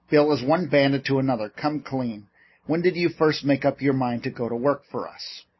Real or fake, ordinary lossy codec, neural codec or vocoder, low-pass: real; MP3, 24 kbps; none; 7.2 kHz